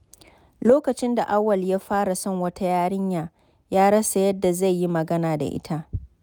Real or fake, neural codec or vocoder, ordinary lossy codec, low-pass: real; none; none; none